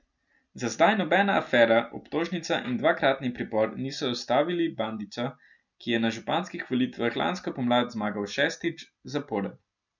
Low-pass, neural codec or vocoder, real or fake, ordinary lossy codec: 7.2 kHz; none; real; none